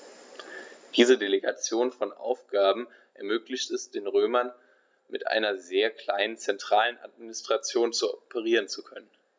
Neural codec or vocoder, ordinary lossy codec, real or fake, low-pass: none; none; real; none